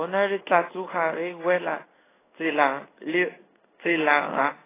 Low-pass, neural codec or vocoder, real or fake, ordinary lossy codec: 3.6 kHz; codec, 16 kHz in and 24 kHz out, 1 kbps, XY-Tokenizer; fake; AAC, 16 kbps